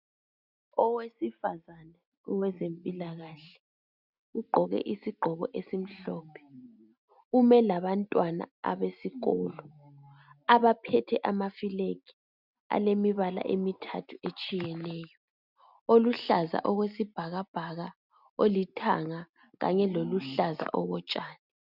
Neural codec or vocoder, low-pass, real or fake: none; 5.4 kHz; real